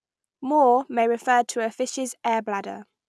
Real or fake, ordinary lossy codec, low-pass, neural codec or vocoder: real; none; none; none